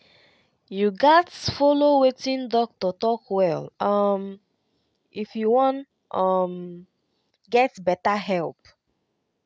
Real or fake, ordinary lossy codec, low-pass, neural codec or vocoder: real; none; none; none